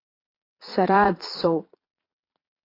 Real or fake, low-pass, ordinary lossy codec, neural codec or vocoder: fake; 5.4 kHz; AAC, 24 kbps; vocoder, 22.05 kHz, 80 mel bands, Vocos